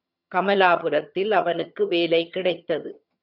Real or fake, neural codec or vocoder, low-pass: fake; vocoder, 22.05 kHz, 80 mel bands, HiFi-GAN; 5.4 kHz